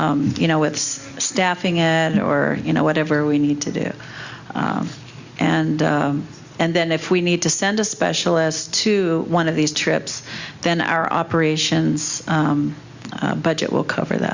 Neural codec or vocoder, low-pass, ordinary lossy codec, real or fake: none; 7.2 kHz; Opus, 64 kbps; real